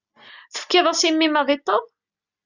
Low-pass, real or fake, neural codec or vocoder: 7.2 kHz; real; none